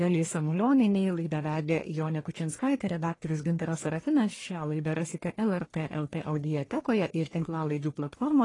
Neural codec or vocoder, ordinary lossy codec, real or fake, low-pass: codec, 44.1 kHz, 1.7 kbps, Pupu-Codec; AAC, 32 kbps; fake; 10.8 kHz